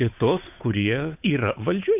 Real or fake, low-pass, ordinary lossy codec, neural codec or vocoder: fake; 3.6 kHz; AAC, 32 kbps; codec, 16 kHz, 8 kbps, FunCodec, trained on Chinese and English, 25 frames a second